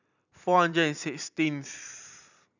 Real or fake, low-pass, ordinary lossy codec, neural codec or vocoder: fake; 7.2 kHz; none; vocoder, 44.1 kHz, 128 mel bands every 256 samples, BigVGAN v2